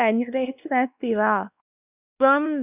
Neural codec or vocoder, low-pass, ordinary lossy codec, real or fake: codec, 16 kHz, 1 kbps, X-Codec, WavLM features, trained on Multilingual LibriSpeech; 3.6 kHz; none; fake